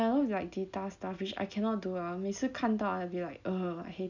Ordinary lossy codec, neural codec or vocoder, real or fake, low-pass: none; none; real; 7.2 kHz